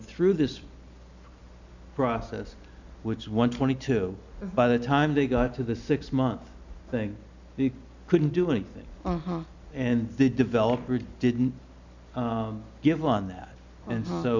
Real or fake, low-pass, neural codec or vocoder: real; 7.2 kHz; none